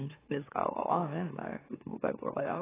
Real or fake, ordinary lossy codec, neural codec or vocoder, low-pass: fake; AAC, 16 kbps; autoencoder, 44.1 kHz, a latent of 192 numbers a frame, MeloTTS; 3.6 kHz